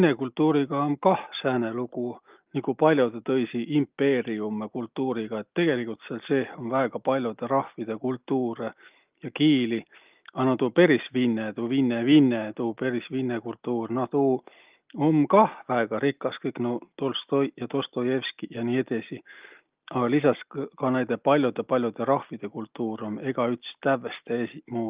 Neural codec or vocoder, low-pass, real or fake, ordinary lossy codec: none; 3.6 kHz; real; Opus, 32 kbps